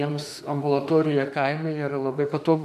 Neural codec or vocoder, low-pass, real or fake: autoencoder, 48 kHz, 32 numbers a frame, DAC-VAE, trained on Japanese speech; 14.4 kHz; fake